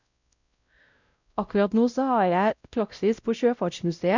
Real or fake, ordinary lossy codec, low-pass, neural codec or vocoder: fake; none; 7.2 kHz; codec, 16 kHz, 0.5 kbps, X-Codec, WavLM features, trained on Multilingual LibriSpeech